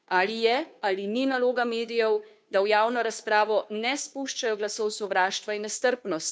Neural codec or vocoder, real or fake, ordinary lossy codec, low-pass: codec, 16 kHz, 2 kbps, FunCodec, trained on Chinese and English, 25 frames a second; fake; none; none